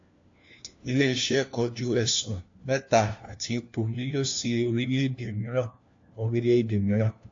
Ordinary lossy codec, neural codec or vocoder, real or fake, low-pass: AAC, 48 kbps; codec, 16 kHz, 1 kbps, FunCodec, trained on LibriTTS, 50 frames a second; fake; 7.2 kHz